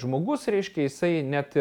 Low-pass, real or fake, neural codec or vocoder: 19.8 kHz; real; none